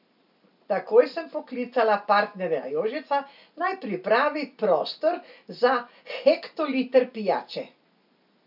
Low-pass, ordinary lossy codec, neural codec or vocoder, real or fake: 5.4 kHz; none; none; real